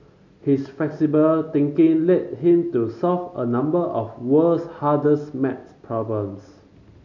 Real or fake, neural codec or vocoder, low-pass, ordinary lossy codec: real; none; 7.2 kHz; none